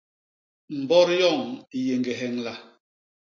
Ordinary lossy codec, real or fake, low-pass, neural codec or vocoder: MP3, 48 kbps; real; 7.2 kHz; none